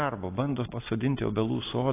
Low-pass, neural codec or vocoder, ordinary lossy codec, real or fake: 3.6 kHz; none; AAC, 24 kbps; real